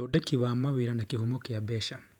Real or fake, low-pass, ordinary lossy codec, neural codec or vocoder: fake; 19.8 kHz; none; vocoder, 48 kHz, 128 mel bands, Vocos